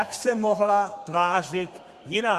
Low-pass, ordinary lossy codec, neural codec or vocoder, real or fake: 14.4 kHz; Opus, 64 kbps; codec, 32 kHz, 1.9 kbps, SNAC; fake